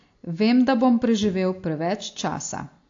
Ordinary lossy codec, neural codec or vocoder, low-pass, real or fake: AAC, 48 kbps; none; 7.2 kHz; real